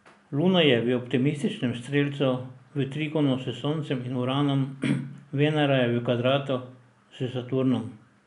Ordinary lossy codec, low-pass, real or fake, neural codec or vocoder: none; 10.8 kHz; real; none